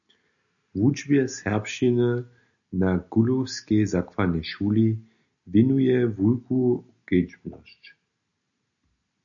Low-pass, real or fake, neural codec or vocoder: 7.2 kHz; real; none